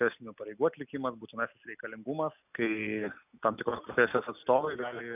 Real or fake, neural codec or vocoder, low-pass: real; none; 3.6 kHz